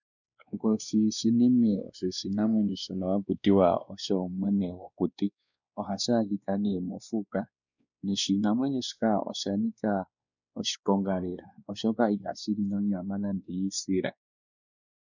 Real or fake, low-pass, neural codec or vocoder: fake; 7.2 kHz; codec, 16 kHz, 2 kbps, X-Codec, WavLM features, trained on Multilingual LibriSpeech